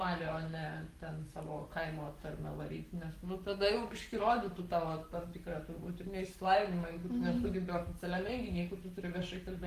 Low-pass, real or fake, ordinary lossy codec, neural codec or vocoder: 14.4 kHz; fake; Opus, 32 kbps; codec, 44.1 kHz, 7.8 kbps, Pupu-Codec